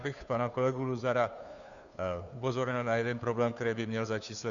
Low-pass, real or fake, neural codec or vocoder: 7.2 kHz; fake; codec, 16 kHz, 2 kbps, FunCodec, trained on Chinese and English, 25 frames a second